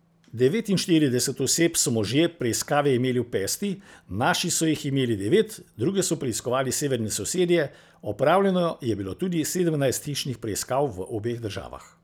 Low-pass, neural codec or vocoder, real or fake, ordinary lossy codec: none; none; real; none